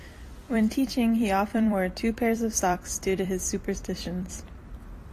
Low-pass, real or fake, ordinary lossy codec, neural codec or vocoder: 14.4 kHz; fake; AAC, 48 kbps; vocoder, 44.1 kHz, 128 mel bands every 256 samples, BigVGAN v2